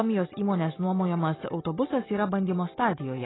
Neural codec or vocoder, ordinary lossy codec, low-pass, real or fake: none; AAC, 16 kbps; 7.2 kHz; real